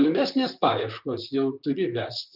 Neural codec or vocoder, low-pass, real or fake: vocoder, 44.1 kHz, 128 mel bands, Pupu-Vocoder; 5.4 kHz; fake